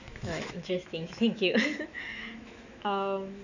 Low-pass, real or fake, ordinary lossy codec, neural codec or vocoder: 7.2 kHz; fake; none; autoencoder, 48 kHz, 128 numbers a frame, DAC-VAE, trained on Japanese speech